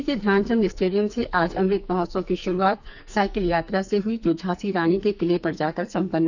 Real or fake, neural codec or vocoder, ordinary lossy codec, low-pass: fake; codec, 44.1 kHz, 2.6 kbps, SNAC; none; 7.2 kHz